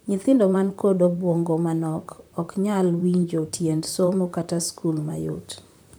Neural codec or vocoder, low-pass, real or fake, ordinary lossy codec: vocoder, 44.1 kHz, 128 mel bands, Pupu-Vocoder; none; fake; none